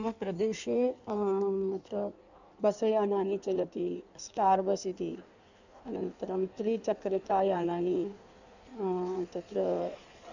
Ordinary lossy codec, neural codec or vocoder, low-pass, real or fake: none; codec, 16 kHz in and 24 kHz out, 1.1 kbps, FireRedTTS-2 codec; 7.2 kHz; fake